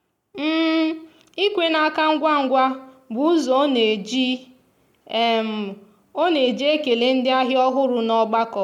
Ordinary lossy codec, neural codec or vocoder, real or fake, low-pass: MP3, 96 kbps; none; real; 19.8 kHz